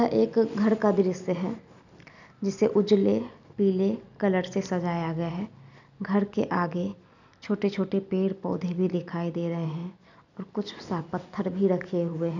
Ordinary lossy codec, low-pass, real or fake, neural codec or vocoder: none; 7.2 kHz; real; none